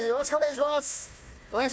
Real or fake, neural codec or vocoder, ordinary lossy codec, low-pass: fake; codec, 16 kHz, 1 kbps, FunCodec, trained on Chinese and English, 50 frames a second; none; none